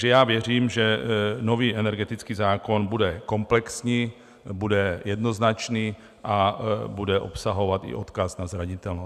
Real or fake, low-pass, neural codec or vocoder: real; 14.4 kHz; none